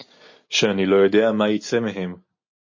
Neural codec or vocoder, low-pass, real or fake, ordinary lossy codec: none; 7.2 kHz; real; MP3, 32 kbps